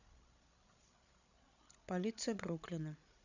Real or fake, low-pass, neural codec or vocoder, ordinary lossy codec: fake; 7.2 kHz; codec, 16 kHz, 16 kbps, FreqCodec, larger model; none